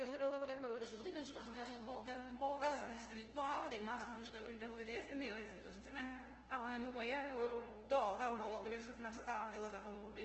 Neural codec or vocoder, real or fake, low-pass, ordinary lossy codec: codec, 16 kHz, 0.5 kbps, FunCodec, trained on LibriTTS, 25 frames a second; fake; 7.2 kHz; Opus, 16 kbps